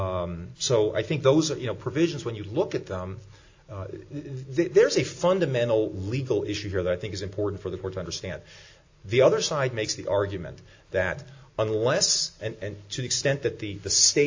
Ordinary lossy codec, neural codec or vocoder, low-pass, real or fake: AAC, 48 kbps; none; 7.2 kHz; real